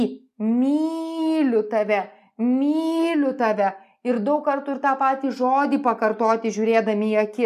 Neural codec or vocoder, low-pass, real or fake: none; 14.4 kHz; real